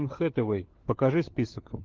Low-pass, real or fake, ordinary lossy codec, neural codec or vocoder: 7.2 kHz; fake; Opus, 16 kbps; codec, 16 kHz, 4 kbps, FunCodec, trained on LibriTTS, 50 frames a second